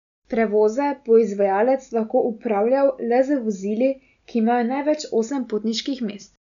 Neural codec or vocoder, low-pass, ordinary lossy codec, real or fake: none; 7.2 kHz; none; real